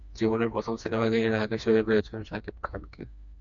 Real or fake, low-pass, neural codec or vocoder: fake; 7.2 kHz; codec, 16 kHz, 2 kbps, FreqCodec, smaller model